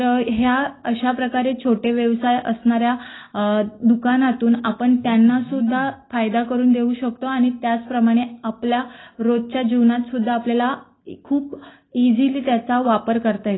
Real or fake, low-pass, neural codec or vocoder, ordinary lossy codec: real; 7.2 kHz; none; AAC, 16 kbps